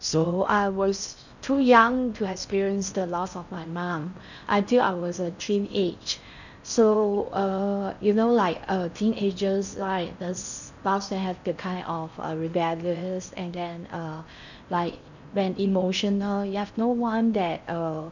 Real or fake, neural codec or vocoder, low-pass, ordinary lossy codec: fake; codec, 16 kHz in and 24 kHz out, 0.6 kbps, FocalCodec, streaming, 4096 codes; 7.2 kHz; none